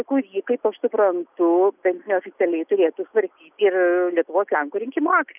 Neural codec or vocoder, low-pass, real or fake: none; 3.6 kHz; real